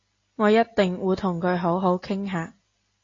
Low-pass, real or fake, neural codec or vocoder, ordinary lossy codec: 7.2 kHz; real; none; AAC, 32 kbps